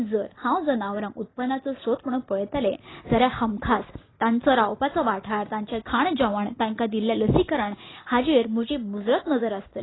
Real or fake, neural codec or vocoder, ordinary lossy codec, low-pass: real; none; AAC, 16 kbps; 7.2 kHz